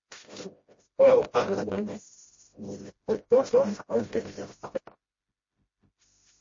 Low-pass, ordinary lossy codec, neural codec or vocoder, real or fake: 7.2 kHz; MP3, 32 kbps; codec, 16 kHz, 0.5 kbps, FreqCodec, smaller model; fake